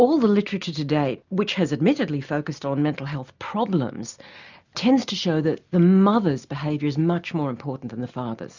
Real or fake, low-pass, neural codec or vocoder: real; 7.2 kHz; none